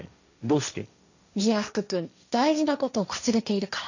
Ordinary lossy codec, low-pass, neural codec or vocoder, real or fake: none; 7.2 kHz; codec, 16 kHz, 1.1 kbps, Voila-Tokenizer; fake